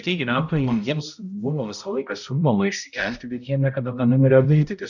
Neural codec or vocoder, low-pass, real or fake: codec, 16 kHz, 0.5 kbps, X-Codec, HuBERT features, trained on balanced general audio; 7.2 kHz; fake